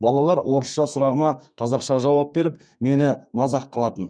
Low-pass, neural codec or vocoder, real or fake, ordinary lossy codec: 9.9 kHz; codec, 32 kHz, 1.9 kbps, SNAC; fake; none